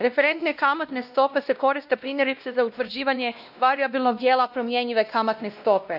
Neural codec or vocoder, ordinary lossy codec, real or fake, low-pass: codec, 16 kHz, 1 kbps, X-Codec, WavLM features, trained on Multilingual LibriSpeech; none; fake; 5.4 kHz